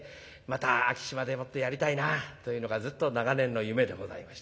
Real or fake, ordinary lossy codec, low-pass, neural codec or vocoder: real; none; none; none